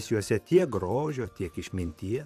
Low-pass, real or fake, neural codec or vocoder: 14.4 kHz; fake; vocoder, 44.1 kHz, 128 mel bands, Pupu-Vocoder